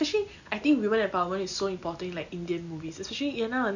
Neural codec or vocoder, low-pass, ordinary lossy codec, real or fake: none; 7.2 kHz; none; real